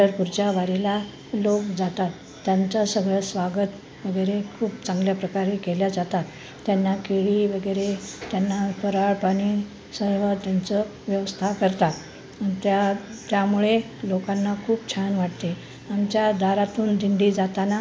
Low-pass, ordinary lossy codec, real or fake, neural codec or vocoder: none; none; real; none